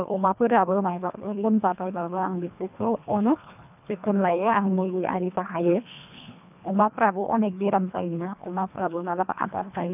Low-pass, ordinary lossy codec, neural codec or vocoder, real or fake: 3.6 kHz; none; codec, 24 kHz, 1.5 kbps, HILCodec; fake